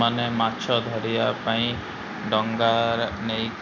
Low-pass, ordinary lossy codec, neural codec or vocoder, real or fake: none; none; none; real